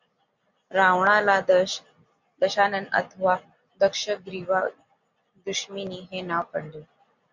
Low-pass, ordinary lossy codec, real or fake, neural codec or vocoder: 7.2 kHz; Opus, 64 kbps; real; none